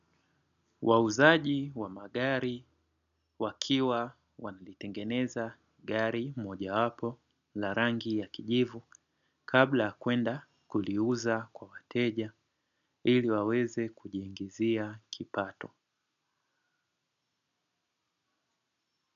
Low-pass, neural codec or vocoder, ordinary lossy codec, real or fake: 7.2 kHz; none; MP3, 96 kbps; real